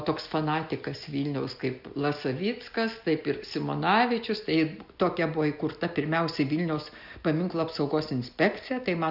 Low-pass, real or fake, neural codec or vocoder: 5.4 kHz; real; none